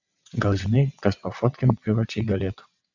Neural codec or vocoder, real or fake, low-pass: vocoder, 22.05 kHz, 80 mel bands, WaveNeXt; fake; 7.2 kHz